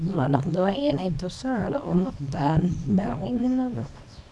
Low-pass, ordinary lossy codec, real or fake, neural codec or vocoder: none; none; fake; codec, 24 kHz, 0.9 kbps, WavTokenizer, small release